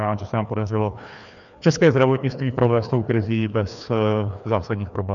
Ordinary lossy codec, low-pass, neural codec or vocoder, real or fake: MP3, 96 kbps; 7.2 kHz; codec, 16 kHz, 2 kbps, FreqCodec, larger model; fake